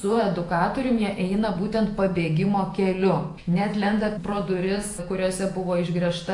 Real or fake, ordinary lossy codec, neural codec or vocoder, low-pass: fake; AAC, 48 kbps; vocoder, 48 kHz, 128 mel bands, Vocos; 10.8 kHz